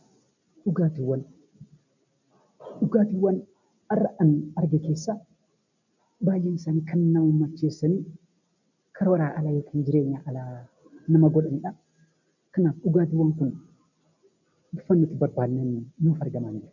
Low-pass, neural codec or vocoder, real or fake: 7.2 kHz; none; real